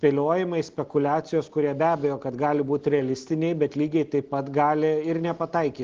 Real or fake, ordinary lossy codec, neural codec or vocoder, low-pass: real; Opus, 16 kbps; none; 7.2 kHz